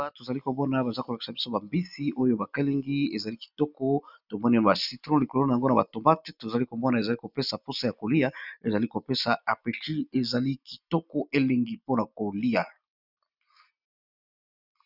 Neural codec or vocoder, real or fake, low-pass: none; real; 5.4 kHz